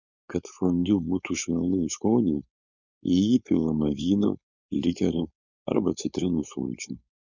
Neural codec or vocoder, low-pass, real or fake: codec, 16 kHz, 4.8 kbps, FACodec; 7.2 kHz; fake